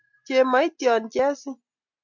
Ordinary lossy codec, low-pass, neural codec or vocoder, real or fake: MP3, 64 kbps; 7.2 kHz; none; real